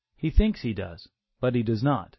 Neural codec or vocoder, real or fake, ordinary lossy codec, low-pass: none; real; MP3, 24 kbps; 7.2 kHz